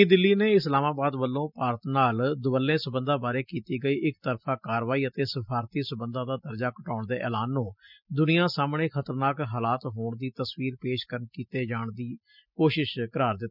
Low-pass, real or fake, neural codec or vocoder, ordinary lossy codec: 5.4 kHz; real; none; none